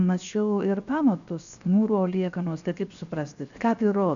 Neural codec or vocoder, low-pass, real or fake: codec, 16 kHz, 0.7 kbps, FocalCodec; 7.2 kHz; fake